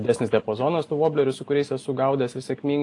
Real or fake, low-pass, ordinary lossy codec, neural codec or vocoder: real; 10.8 kHz; AAC, 48 kbps; none